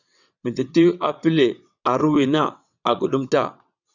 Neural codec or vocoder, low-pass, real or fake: vocoder, 22.05 kHz, 80 mel bands, WaveNeXt; 7.2 kHz; fake